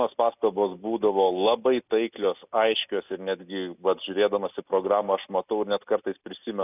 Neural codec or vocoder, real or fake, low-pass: none; real; 3.6 kHz